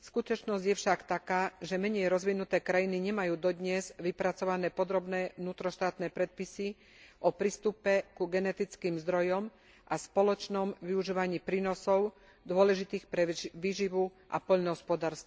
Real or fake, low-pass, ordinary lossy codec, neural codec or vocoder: real; none; none; none